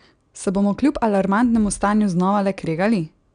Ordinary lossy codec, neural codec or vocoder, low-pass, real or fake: none; none; 9.9 kHz; real